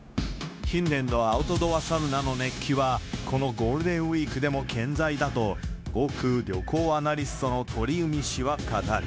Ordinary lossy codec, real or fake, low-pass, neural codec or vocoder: none; fake; none; codec, 16 kHz, 0.9 kbps, LongCat-Audio-Codec